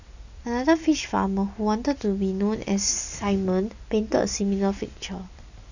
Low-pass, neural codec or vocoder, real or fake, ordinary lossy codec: 7.2 kHz; none; real; none